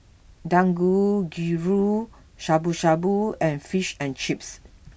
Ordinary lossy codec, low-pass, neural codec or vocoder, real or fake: none; none; none; real